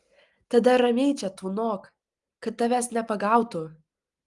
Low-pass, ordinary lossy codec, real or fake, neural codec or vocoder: 10.8 kHz; Opus, 32 kbps; real; none